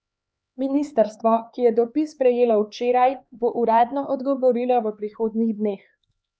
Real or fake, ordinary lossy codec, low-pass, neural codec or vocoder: fake; none; none; codec, 16 kHz, 4 kbps, X-Codec, HuBERT features, trained on LibriSpeech